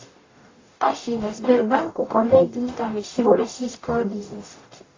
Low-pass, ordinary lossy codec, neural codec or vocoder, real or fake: 7.2 kHz; AAC, 32 kbps; codec, 44.1 kHz, 0.9 kbps, DAC; fake